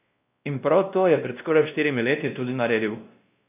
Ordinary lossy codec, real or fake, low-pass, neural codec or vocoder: none; fake; 3.6 kHz; codec, 16 kHz, 1 kbps, X-Codec, WavLM features, trained on Multilingual LibriSpeech